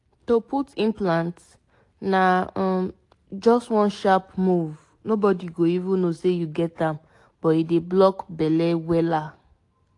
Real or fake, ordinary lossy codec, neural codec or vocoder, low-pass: real; AAC, 48 kbps; none; 10.8 kHz